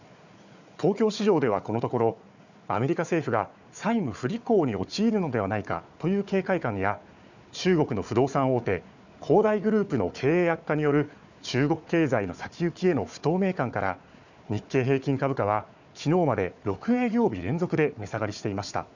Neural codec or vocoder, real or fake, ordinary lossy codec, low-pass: codec, 16 kHz, 4 kbps, FunCodec, trained on Chinese and English, 50 frames a second; fake; none; 7.2 kHz